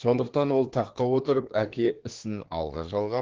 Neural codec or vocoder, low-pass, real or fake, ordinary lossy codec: codec, 24 kHz, 1 kbps, SNAC; 7.2 kHz; fake; Opus, 16 kbps